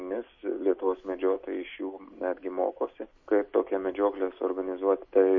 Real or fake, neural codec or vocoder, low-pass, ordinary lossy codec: real; none; 7.2 kHz; MP3, 32 kbps